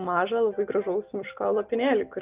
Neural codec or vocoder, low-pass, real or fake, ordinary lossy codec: none; 3.6 kHz; real; Opus, 24 kbps